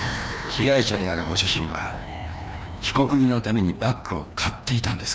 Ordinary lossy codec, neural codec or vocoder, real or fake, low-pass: none; codec, 16 kHz, 1 kbps, FreqCodec, larger model; fake; none